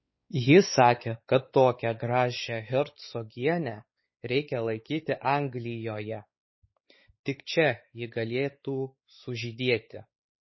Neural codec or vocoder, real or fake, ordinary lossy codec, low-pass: codec, 16 kHz, 4 kbps, X-Codec, WavLM features, trained on Multilingual LibriSpeech; fake; MP3, 24 kbps; 7.2 kHz